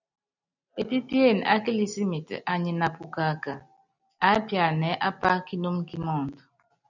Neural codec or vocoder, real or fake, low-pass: none; real; 7.2 kHz